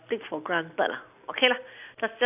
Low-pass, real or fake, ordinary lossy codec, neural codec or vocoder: 3.6 kHz; real; none; none